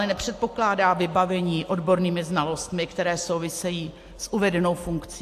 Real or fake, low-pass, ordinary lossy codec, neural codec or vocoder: real; 14.4 kHz; AAC, 64 kbps; none